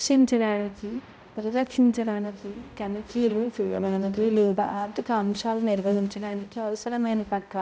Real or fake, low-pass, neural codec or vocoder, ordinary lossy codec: fake; none; codec, 16 kHz, 0.5 kbps, X-Codec, HuBERT features, trained on balanced general audio; none